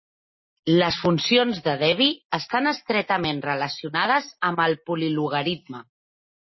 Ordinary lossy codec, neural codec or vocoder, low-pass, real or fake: MP3, 24 kbps; none; 7.2 kHz; real